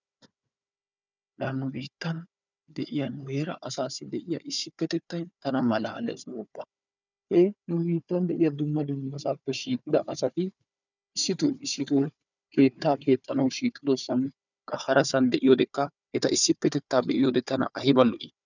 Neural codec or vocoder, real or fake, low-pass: codec, 16 kHz, 4 kbps, FunCodec, trained on Chinese and English, 50 frames a second; fake; 7.2 kHz